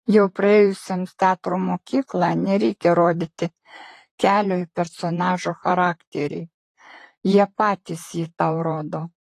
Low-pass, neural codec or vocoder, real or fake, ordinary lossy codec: 14.4 kHz; vocoder, 44.1 kHz, 128 mel bands, Pupu-Vocoder; fake; AAC, 48 kbps